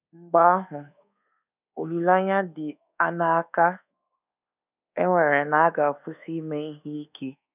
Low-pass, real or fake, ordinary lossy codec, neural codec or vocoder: 3.6 kHz; fake; none; codec, 24 kHz, 1.2 kbps, DualCodec